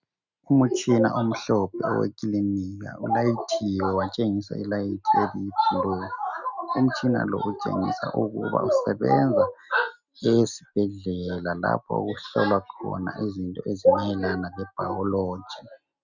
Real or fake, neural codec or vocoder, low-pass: real; none; 7.2 kHz